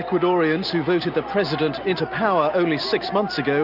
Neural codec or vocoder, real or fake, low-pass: none; real; 5.4 kHz